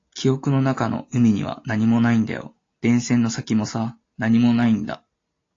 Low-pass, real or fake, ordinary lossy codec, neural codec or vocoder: 7.2 kHz; real; AAC, 32 kbps; none